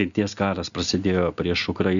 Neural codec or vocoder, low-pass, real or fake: none; 7.2 kHz; real